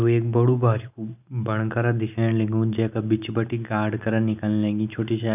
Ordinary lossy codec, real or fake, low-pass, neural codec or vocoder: none; real; 3.6 kHz; none